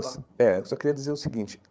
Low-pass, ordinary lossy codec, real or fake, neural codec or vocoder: none; none; fake; codec, 16 kHz, 8 kbps, FreqCodec, larger model